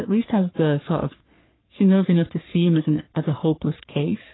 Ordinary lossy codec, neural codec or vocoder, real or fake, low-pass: AAC, 16 kbps; codec, 44.1 kHz, 3.4 kbps, Pupu-Codec; fake; 7.2 kHz